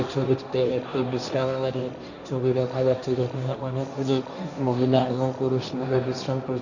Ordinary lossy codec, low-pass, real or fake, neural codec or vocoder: none; 7.2 kHz; fake; codec, 16 kHz, 1.1 kbps, Voila-Tokenizer